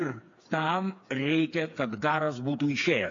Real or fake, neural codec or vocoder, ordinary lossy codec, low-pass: fake; codec, 16 kHz, 4 kbps, FreqCodec, smaller model; AAC, 64 kbps; 7.2 kHz